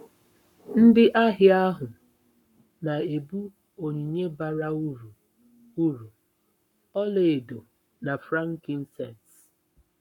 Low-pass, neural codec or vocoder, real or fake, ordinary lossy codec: 19.8 kHz; codec, 44.1 kHz, 7.8 kbps, Pupu-Codec; fake; none